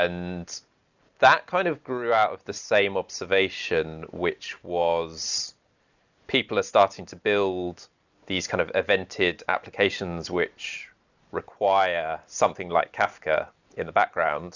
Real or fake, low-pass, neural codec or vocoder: real; 7.2 kHz; none